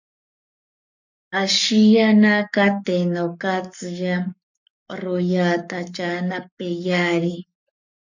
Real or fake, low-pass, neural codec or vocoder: fake; 7.2 kHz; codec, 44.1 kHz, 7.8 kbps, Pupu-Codec